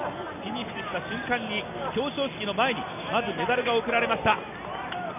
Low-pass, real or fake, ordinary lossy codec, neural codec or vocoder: 3.6 kHz; real; none; none